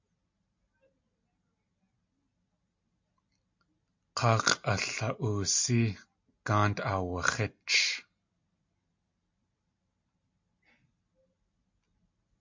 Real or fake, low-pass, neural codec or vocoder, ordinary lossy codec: real; 7.2 kHz; none; MP3, 48 kbps